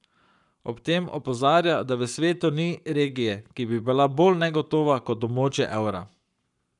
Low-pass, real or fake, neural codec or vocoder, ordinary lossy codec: 10.8 kHz; fake; codec, 44.1 kHz, 7.8 kbps, Pupu-Codec; none